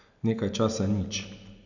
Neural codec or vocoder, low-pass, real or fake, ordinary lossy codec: none; 7.2 kHz; real; none